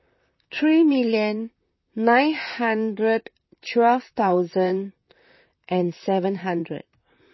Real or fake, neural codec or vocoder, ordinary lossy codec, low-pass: fake; vocoder, 44.1 kHz, 128 mel bands, Pupu-Vocoder; MP3, 24 kbps; 7.2 kHz